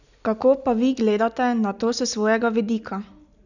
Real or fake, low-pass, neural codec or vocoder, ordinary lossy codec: real; 7.2 kHz; none; none